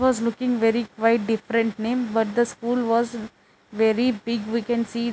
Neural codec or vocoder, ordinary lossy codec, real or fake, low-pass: none; none; real; none